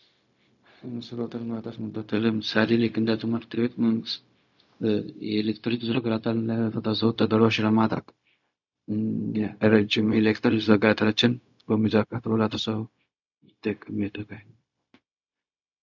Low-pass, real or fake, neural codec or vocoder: 7.2 kHz; fake; codec, 16 kHz, 0.4 kbps, LongCat-Audio-Codec